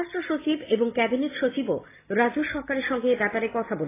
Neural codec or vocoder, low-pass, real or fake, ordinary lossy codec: none; 3.6 kHz; real; AAC, 16 kbps